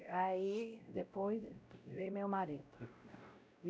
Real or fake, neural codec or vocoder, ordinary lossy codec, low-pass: fake; codec, 16 kHz, 0.5 kbps, X-Codec, WavLM features, trained on Multilingual LibriSpeech; none; none